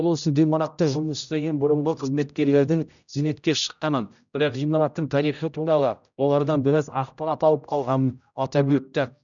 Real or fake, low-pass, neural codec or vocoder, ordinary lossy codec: fake; 7.2 kHz; codec, 16 kHz, 0.5 kbps, X-Codec, HuBERT features, trained on general audio; none